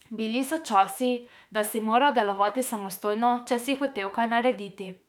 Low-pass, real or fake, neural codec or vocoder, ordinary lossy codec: 19.8 kHz; fake; autoencoder, 48 kHz, 32 numbers a frame, DAC-VAE, trained on Japanese speech; none